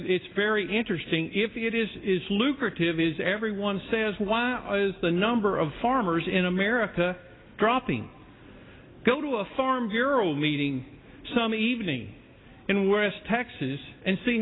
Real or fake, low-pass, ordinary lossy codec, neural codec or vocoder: real; 7.2 kHz; AAC, 16 kbps; none